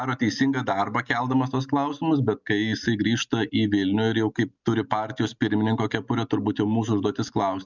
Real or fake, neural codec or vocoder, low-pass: real; none; 7.2 kHz